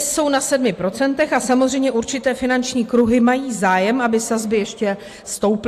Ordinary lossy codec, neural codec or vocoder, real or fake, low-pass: AAC, 64 kbps; none; real; 14.4 kHz